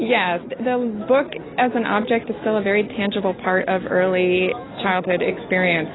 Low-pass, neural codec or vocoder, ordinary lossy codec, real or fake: 7.2 kHz; none; AAC, 16 kbps; real